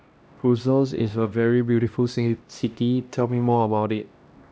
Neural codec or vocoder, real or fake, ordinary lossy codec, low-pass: codec, 16 kHz, 1 kbps, X-Codec, HuBERT features, trained on LibriSpeech; fake; none; none